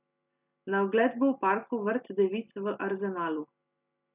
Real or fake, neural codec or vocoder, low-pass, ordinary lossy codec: real; none; 3.6 kHz; none